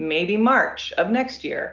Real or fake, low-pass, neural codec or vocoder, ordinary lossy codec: real; 7.2 kHz; none; Opus, 24 kbps